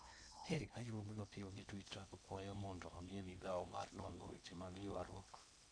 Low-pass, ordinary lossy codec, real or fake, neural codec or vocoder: 9.9 kHz; none; fake; codec, 16 kHz in and 24 kHz out, 0.8 kbps, FocalCodec, streaming, 65536 codes